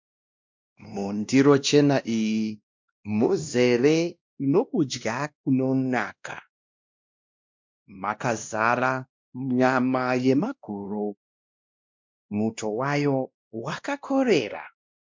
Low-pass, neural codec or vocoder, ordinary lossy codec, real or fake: 7.2 kHz; codec, 16 kHz, 1 kbps, X-Codec, WavLM features, trained on Multilingual LibriSpeech; MP3, 64 kbps; fake